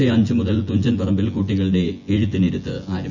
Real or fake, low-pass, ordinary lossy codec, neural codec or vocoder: fake; 7.2 kHz; none; vocoder, 24 kHz, 100 mel bands, Vocos